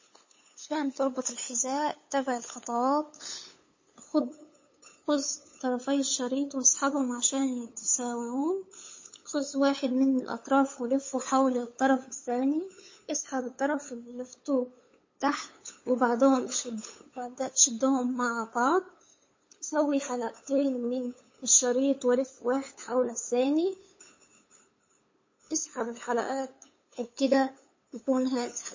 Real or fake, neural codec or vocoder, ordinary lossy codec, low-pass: fake; codec, 16 kHz, 8 kbps, FunCodec, trained on LibriTTS, 25 frames a second; MP3, 32 kbps; 7.2 kHz